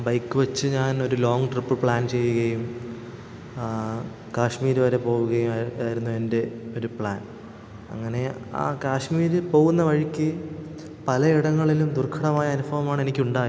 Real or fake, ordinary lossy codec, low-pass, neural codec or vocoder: real; none; none; none